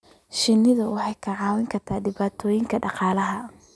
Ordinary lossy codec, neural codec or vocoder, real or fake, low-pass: none; none; real; none